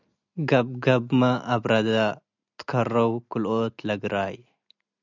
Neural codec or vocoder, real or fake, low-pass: none; real; 7.2 kHz